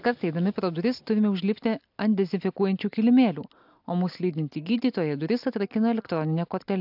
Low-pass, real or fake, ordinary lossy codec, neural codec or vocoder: 5.4 kHz; real; AAC, 48 kbps; none